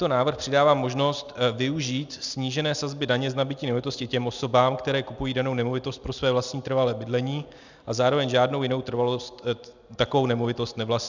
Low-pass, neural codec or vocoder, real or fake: 7.2 kHz; none; real